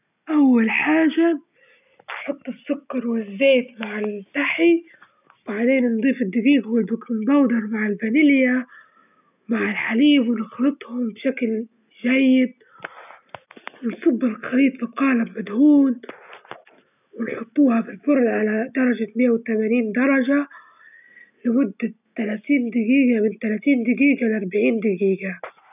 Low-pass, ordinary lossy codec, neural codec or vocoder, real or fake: 3.6 kHz; none; none; real